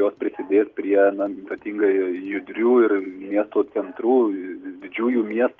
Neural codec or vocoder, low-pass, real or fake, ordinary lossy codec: none; 7.2 kHz; real; Opus, 24 kbps